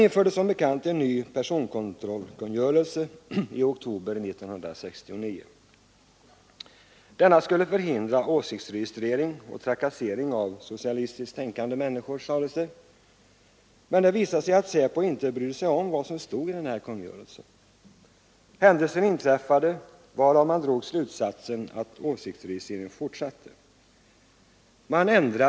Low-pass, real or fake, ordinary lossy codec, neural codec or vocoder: none; real; none; none